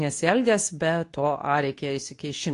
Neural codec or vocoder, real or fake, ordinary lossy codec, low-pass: codec, 24 kHz, 0.9 kbps, WavTokenizer, medium speech release version 2; fake; AAC, 48 kbps; 10.8 kHz